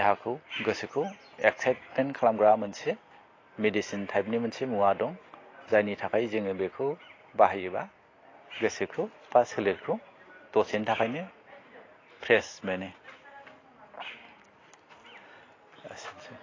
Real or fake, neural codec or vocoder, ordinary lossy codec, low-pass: real; none; AAC, 32 kbps; 7.2 kHz